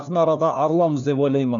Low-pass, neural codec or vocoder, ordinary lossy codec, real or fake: 7.2 kHz; codec, 16 kHz, 1 kbps, FunCodec, trained on LibriTTS, 50 frames a second; none; fake